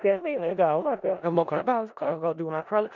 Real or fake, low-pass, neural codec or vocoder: fake; 7.2 kHz; codec, 16 kHz in and 24 kHz out, 0.4 kbps, LongCat-Audio-Codec, four codebook decoder